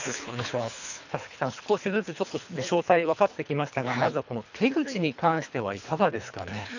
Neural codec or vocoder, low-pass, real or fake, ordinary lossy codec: codec, 24 kHz, 3 kbps, HILCodec; 7.2 kHz; fake; none